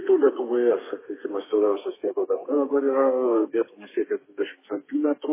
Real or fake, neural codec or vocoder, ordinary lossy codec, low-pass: fake; codec, 32 kHz, 1.9 kbps, SNAC; MP3, 16 kbps; 3.6 kHz